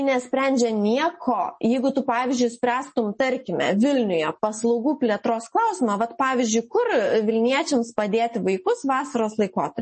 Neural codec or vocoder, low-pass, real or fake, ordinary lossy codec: none; 10.8 kHz; real; MP3, 32 kbps